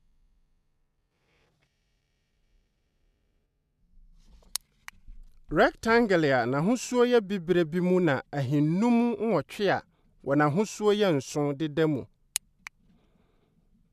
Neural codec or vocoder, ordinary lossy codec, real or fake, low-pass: none; none; real; 14.4 kHz